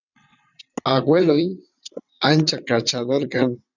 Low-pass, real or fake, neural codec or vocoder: 7.2 kHz; fake; vocoder, 22.05 kHz, 80 mel bands, WaveNeXt